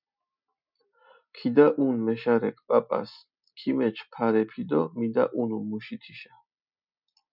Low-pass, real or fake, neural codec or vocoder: 5.4 kHz; real; none